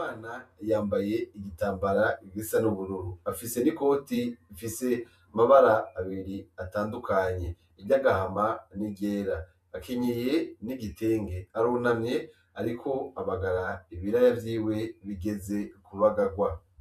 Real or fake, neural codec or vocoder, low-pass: fake; vocoder, 48 kHz, 128 mel bands, Vocos; 14.4 kHz